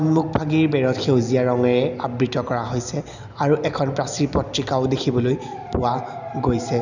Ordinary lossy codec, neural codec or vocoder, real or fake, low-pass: none; none; real; 7.2 kHz